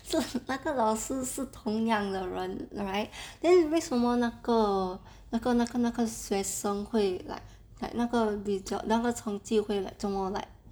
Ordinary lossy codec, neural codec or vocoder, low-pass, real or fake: none; none; none; real